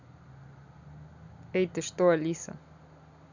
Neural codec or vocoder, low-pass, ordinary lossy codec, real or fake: none; 7.2 kHz; none; real